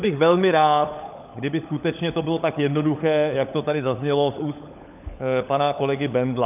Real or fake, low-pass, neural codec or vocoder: fake; 3.6 kHz; codec, 16 kHz, 16 kbps, FunCodec, trained on LibriTTS, 50 frames a second